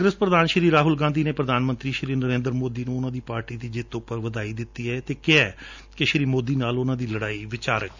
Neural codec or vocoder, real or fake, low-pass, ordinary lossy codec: none; real; 7.2 kHz; none